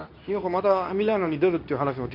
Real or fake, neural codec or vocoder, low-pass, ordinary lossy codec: fake; codec, 24 kHz, 0.9 kbps, WavTokenizer, medium speech release version 2; 5.4 kHz; AAC, 48 kbps